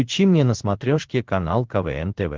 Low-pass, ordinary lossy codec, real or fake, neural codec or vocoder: 7.2 kHz; Opus, 16 kbps; fake; codec, 16 kHz in and 24 kHz out, 1 kbps, XY-Tokenizer